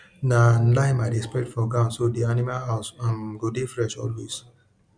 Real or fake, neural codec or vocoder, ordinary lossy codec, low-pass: real; none; none; 9.9 kHz